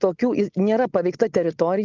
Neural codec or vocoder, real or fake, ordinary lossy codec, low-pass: none; real; Opus, 24 kbps; 7.2 kHz